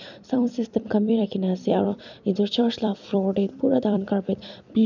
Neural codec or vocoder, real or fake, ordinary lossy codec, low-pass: vocoder, 44.1 kHz, 128 mel bands every 256 samples, BigVGAN v2; fake; none; 7.2 kHz